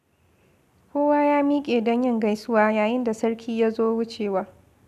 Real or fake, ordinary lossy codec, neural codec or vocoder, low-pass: real; none; none; 14.4 kHz